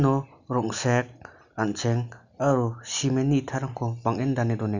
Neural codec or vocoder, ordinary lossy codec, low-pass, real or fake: none; none; 7.2 kHz; real